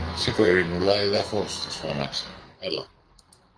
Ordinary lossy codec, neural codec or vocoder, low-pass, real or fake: Opus, 32 kbps; codec, 44.1 kHz, 2.6 kbps, SNAC; 9.9 kHz; fake